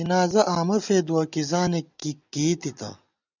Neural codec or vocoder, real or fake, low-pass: none; real; 7.2 kHz